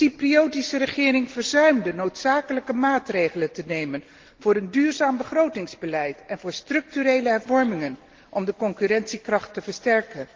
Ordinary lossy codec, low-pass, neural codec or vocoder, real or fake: Opus, 16 kbps; 7.2 kHz; none; real